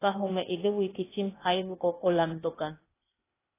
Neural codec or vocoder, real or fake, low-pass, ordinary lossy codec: codec, 16 kHz, 0.8 kbps, ZipCodec; fake; 3.6 kHz; AAC, 16 kbps